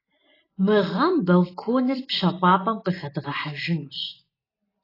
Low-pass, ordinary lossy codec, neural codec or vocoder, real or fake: 5.4 kHz; AAC, 24 kbps; none; real